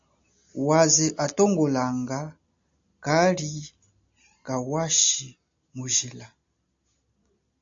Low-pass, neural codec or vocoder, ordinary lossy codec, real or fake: 7.2 kHz; none; AAC, 64 kbps; real